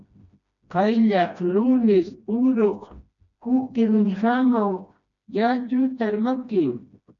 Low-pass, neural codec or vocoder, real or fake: 7.2 kHz; codec, 16 kHz, 1 kbps, FreqCodec, smaller model; fake